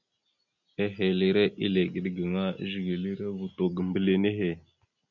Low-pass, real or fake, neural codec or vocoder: 7.2 kHz; real; none